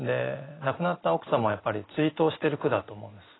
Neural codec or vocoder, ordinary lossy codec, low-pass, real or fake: none; AAC, 16 kbps; 7.2 kHz; real